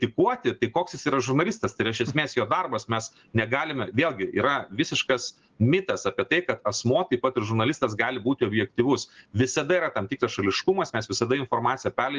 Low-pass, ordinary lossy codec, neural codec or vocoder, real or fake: 7.2 kHz; Opus, 16 kbps; none; real